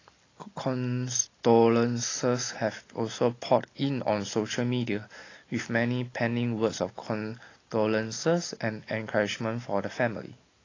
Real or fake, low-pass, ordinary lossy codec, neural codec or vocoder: real; 7.2 kHz; AAC, 32 kbps; none